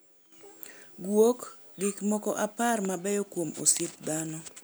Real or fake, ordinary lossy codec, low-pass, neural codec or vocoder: real; none; none; none